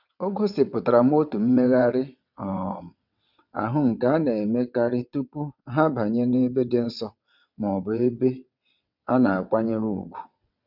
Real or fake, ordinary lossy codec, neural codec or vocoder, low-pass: fake; none; vocoder, 22.05 kHz, 80 mel bands, WaveNeXt; 5.4 kHz